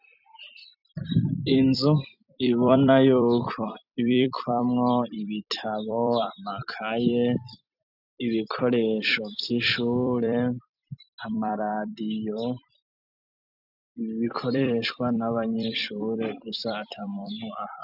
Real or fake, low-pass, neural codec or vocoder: fake; 5.4 kHz; vocoder, 44.1 kHz, 128 mel bands every 256 samples, BigVGAN v2